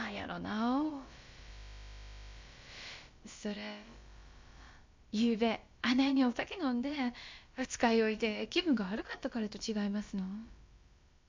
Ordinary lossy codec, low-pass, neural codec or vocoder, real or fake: MP3, 48 kbps; 7.2 kHz; codec, 16 kHz, about 1 kbps, DyCAST, with the encoder's durations; fake